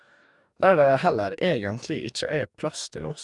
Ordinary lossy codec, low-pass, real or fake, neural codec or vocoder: none; 10.8 kHz; fake; codec, 44.1 kHz, 2.6 kbps, DAC